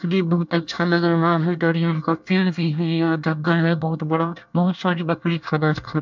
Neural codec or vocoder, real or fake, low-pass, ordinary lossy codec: codec, 24 kHz, 1 kbps, SNAC; fake; 7.2 kHz; MP3, 64 kbps